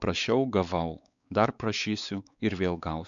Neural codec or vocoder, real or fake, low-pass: codec, 16 kHz, 4 kbps, X-Codec, WavLM features, trained on Multilingual LibriSpeech; fake; 7.2 kHz